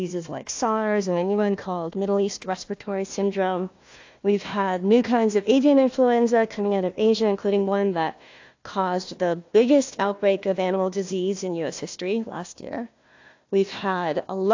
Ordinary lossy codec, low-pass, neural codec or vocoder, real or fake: AAC, 48 kbps; 7.2 kHz; codec, 16 kHz, 1 kbps, FunCodec, trained on Chinese and English, 50 frames a second; fake